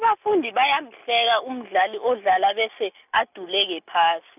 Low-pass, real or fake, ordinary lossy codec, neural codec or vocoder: 3.6 kHz; real; none; none